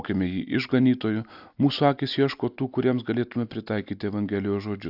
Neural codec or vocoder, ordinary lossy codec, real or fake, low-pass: none; AAC, 48 kbps; real; 5.4 kHz